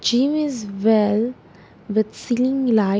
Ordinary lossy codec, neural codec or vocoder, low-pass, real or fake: none; none; none; real